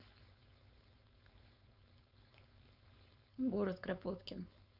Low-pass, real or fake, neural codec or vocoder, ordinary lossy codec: 5.4 kHz; fake; codec, 16 kHz, 4.8 kbps, FACodec; none